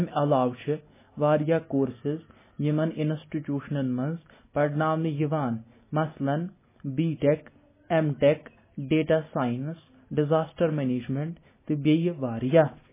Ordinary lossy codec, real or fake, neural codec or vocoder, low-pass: MP3, 16 kbps; real; none; 3.6 kHz